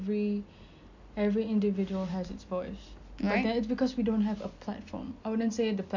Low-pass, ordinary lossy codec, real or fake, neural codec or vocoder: 7.2 kHz; none; real; none